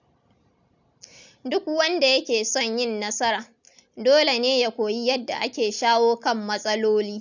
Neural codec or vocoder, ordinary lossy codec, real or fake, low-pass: none; none; real; 7.2 kHz